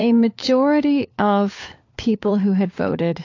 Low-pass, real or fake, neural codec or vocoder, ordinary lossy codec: 7.2 kHz; fake; codec, 16 kHz, 4 kbps, FunCodec, trained on LibriTTS, 50 frames a second; AAC, 48 kbps